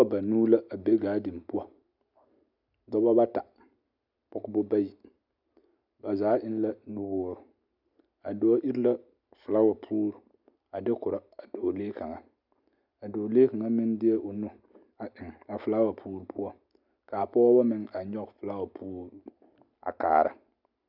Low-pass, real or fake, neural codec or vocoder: 5.4 kHz; real; none